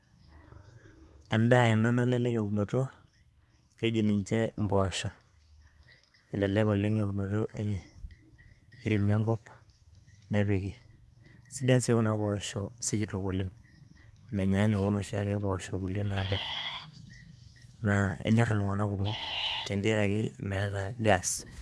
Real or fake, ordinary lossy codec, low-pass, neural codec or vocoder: fake; none; none; codec, 24 kHz, 1 kbps, SNAC